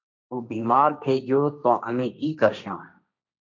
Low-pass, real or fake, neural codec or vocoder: 7.2 kHz; fake; codec, 16 kHz, 1.1 kbps, Voila-Tokenizer